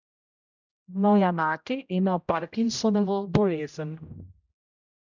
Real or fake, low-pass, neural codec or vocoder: fake; 7.2 kHz; codec, 16 kHz, 0.5 kbps, X-Codec, HuBERT features, trained on general audio